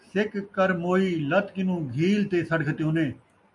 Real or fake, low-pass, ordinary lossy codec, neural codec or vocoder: real; 10.8 kHz; AAC, 64 kbps; none